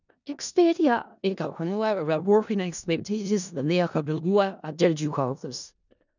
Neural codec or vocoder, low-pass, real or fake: codec, 16 kHz in and 24 kHz out, 0.4 kbps, LongCat-Audio-Codec, four codebook decoder; 7.2 kHz; fake